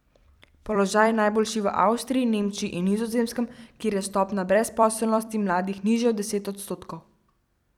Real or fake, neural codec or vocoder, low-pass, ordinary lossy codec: fake; vocoder, 44.1 kHz, 128 mel bands every 256 samples, BigVGAN v2; 19.8 kHz; none